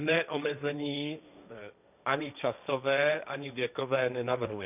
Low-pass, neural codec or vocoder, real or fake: 3.6 kHz; codec, 16 kHz, 1.1 kbps, Voila-Tokenizer; fake